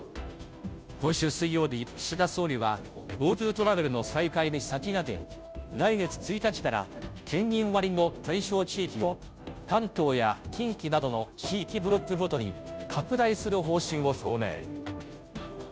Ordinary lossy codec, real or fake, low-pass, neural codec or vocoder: none; fake; none; codec, 16 kHz, 0.5 kbps, FunCodec, trained on Chinese and English, 25 frames a second